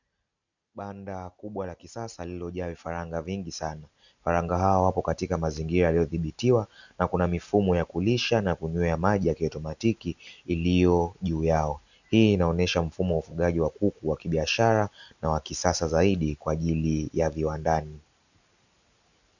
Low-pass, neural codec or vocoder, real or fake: 7.2 kHz; none; real